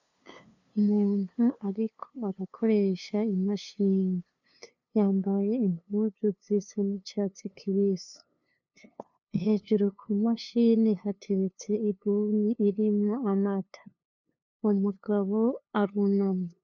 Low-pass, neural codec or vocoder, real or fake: 7.2 kHz; codec, 16 kHz, 2 kbps, FunCodec, trained on LibriTTS, 25 frames a second; fake